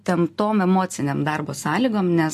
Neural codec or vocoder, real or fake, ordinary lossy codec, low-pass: none; real; MP3, 64 kbps; 14.4 kHz